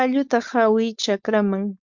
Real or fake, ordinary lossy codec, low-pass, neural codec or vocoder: fake; Opus, 64 kbps; 7.2 kHz; codec, 16 kHz, 4.8 kbps, FACodec